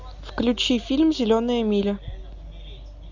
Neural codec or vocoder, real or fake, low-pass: none; real; 7.2 kHz